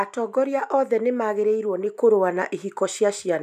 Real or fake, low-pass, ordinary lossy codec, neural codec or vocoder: real; 14.4 kHz; none; none